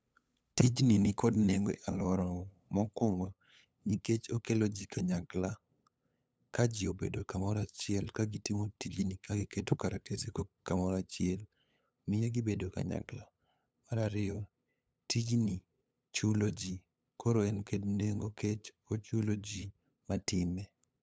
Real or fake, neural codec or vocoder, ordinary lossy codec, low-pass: fake; codec, 16 kHz, 8 kbps, FunCodec, trained on LibriTTS, 25 frames a second; none; none